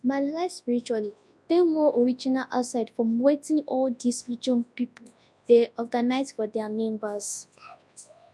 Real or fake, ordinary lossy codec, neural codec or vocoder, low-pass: fake; none; codec, 24 kHz, 0.9 kbps, WavTokenizer, large speech release; none